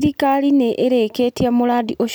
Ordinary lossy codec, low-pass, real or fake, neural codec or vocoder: none; none; real; none